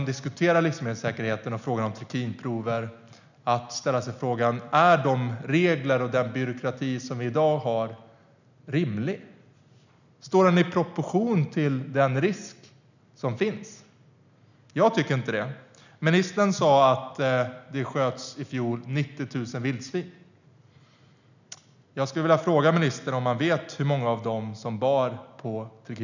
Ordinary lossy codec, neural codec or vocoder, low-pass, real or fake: none; none; 7.2 kHz; real